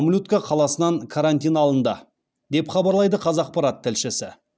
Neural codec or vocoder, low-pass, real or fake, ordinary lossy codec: none; none; real; none